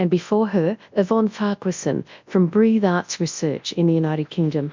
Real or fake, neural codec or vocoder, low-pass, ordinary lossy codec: fake; codec, 24 kHz, 0.9 kbps, WavTokenizer, large speech release; 7.2 kHz; MP3, 64 kbps